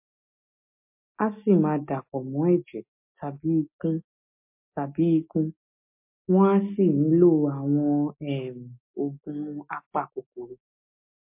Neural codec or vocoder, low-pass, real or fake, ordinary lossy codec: none; 3.6 kHz; real; MP3, 32 kbps